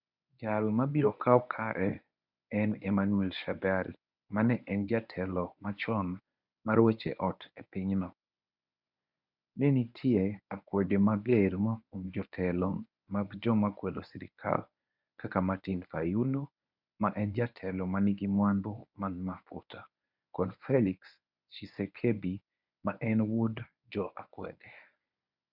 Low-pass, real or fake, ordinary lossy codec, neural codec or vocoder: 5.4 kHz; fake; none; codec, 24 kHz, 0.9 kbps, WavTokenizer, medium speech release version 1